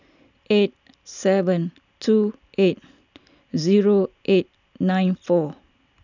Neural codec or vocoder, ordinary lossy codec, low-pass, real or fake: none; none; 7.2 kHz; real